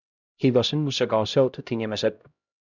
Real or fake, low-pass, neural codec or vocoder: fake; 7.2 kHz; codec, 16 kHz, 0.5 kbps, X-Codec, HuBERT features, trained on LibriSpeech